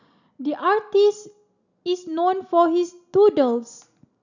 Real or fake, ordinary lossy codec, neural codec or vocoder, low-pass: real; none; none; 7.2 kHz